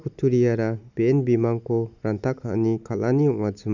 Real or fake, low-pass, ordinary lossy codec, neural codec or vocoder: real; 7.2 kHz; none; none